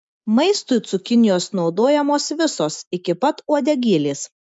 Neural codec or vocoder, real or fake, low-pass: none; real; 9.9 kHz